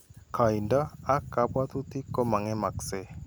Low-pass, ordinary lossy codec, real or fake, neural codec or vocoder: none; none; real; none